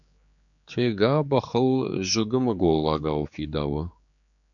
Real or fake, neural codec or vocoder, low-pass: fake; codec, 16 kHz, 4 kbps, X-Codec, HuBERT features, trained on general audio; 7.2 kHz